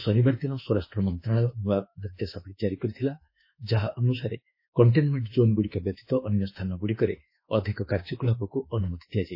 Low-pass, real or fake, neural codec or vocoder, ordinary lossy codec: 5.4 kHz; fake; autoencoder, 48 kHz, 32 numbers a frame, DAC-VAE, trained on Japanese speech; MP3, 24 kbps